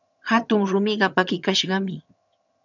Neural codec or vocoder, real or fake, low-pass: vocoder, 22.05 kHz, 80 mel bands, HiFi-GAN; fake; 7.2 kHz